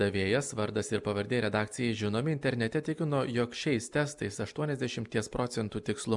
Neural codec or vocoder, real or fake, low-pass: none; real; 10.8 kHz